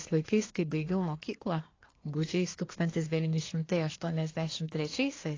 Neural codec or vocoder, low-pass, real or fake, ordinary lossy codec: codec, 24 kHz, 1 kbps, SNAC; 7.2 kHz; fake; AAC, 32 kbps